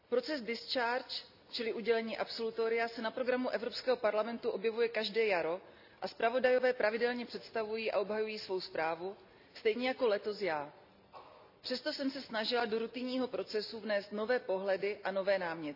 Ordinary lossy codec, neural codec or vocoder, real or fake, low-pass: none; none; real; 5.4 kHz